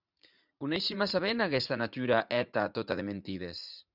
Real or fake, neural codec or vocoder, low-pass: fake; vocoder, 44.1 kHz, 80 mel bands, Vocos; 5.4 kHz